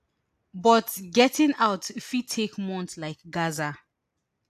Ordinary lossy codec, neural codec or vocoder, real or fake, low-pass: AAC, 96 kbps; none; real; 14.4 kHz